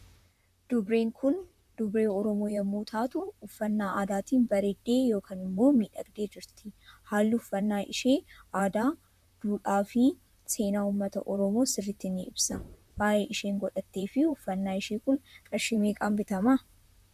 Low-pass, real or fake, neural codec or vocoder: 14.4 kHz; fake; vocoder, 44.1 kHz, 128 mel bands, Pupu-Vocoder